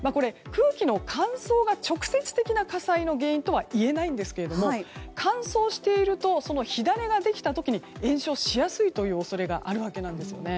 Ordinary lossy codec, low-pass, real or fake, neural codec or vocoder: none; none; real; none